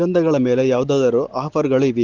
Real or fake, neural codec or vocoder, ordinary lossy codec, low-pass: real; none; Opus, 16 kbps; 7.2 kHz